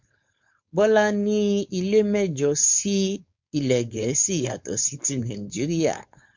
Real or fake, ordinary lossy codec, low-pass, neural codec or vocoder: fake; MP3, 64 kbps; 7.2 kHz; codec, 16 kHz, 4.8 kbps, FACodec